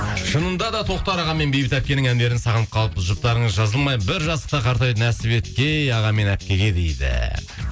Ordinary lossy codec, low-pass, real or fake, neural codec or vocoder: none; none; real; none